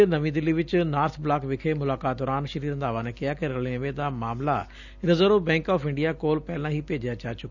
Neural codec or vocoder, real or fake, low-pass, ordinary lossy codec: none; real; 7.2 kHz; none